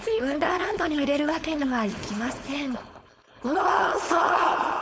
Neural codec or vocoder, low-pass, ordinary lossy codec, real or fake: codec, 16 kHz, 4.8 kbps, FACodec; none; none; fake